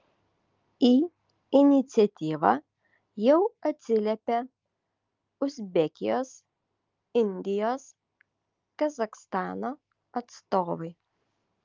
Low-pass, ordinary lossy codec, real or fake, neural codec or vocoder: 7.2 kHz; Opus, 24 kbps; real; none